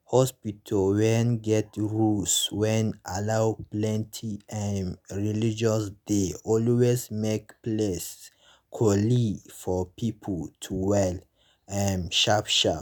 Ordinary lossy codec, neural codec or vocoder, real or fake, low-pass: none; none; real; none